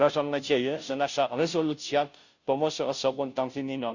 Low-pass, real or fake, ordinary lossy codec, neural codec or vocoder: 7.2 kHz; fake; none; codec, 16 kHz, 0.5 kbps, FunCodec, trained on Chinese and English, 25 frames a second